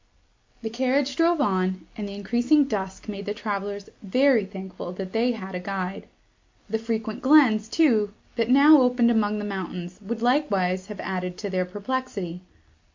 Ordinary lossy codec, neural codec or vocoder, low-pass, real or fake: MP3, 48 kbps; none; 7.2 kHz; real